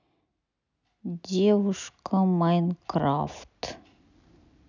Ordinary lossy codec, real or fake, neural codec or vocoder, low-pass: none; real; none; 7.2 kHz